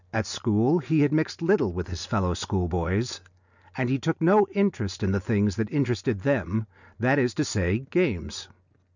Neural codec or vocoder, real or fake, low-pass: none; real; 7.2 kHz